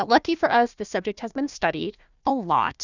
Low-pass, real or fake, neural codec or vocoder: 7.2 kHz; fake; codec, 16 kHz, 1 kbps, FunCodec, trained on Chinese and English, 50 frames a second